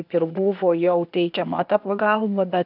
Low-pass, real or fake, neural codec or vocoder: 5.4 kHz; fake; codec, 16 kHz, 0.8 kbps, ZipCodec